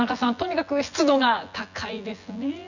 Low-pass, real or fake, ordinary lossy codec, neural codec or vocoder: 7.2 kHz; fake; none; vocoder, 24 kHz, 100 mel bands, Vocos